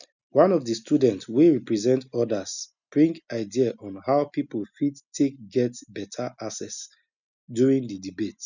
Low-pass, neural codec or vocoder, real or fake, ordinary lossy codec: 7.2 kHz; none; real; none